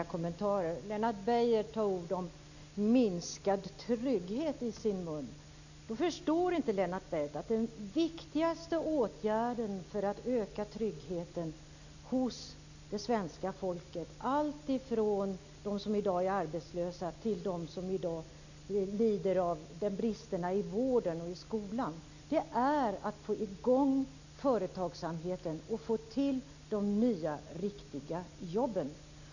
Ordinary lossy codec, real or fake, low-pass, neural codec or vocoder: none; real; 7.2 kHz; none